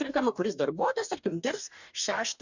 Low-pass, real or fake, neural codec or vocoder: 7.2 kHz; fake; codec, 44.1 kHz, 2.6 kbps, DAC